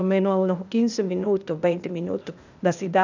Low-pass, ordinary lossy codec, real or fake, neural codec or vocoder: 7.2 kHz; none; fake; codec, 16 kHz, 0.8 kbps, ZipCodec